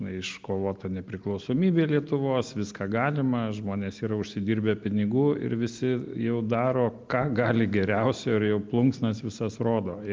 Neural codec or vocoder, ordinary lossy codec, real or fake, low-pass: none; Opus, 24 kbps; real; 7.2 kHz